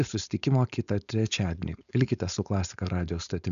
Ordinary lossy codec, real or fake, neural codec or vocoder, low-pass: MP3, 96 kbps; fake; codec, 16 kHz, 4.8 kbps, FACodec; 7.2 kHz